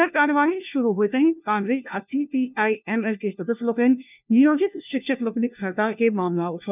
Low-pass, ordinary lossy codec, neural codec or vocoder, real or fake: 3.6 kHz; none; codec, 16 kHz, 1 kbps, FunCodec, trained on LibriTTS, 50 frames a second; fake